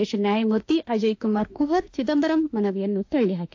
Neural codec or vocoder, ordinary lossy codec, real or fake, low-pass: autoencoder, 48 kHz, 32 numbers a frame, DAC-VAE, trained on Japanese speech; AAC, 48 kbps; fake; 7.2 kHz